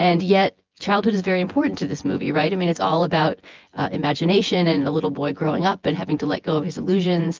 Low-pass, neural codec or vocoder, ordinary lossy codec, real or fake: 7.2 kHz; vocoder, 24 kHz, 100 mel bands, Vocos; Opus, 32 kbps; fake